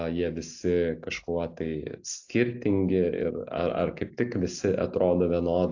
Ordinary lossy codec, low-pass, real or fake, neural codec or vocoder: AAC, 48 kbps; 7.2 kHz; real; none